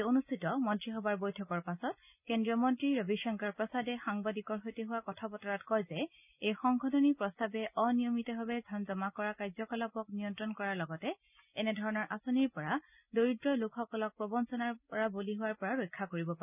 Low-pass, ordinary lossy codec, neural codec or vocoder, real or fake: 3.6 kHz; none; none; real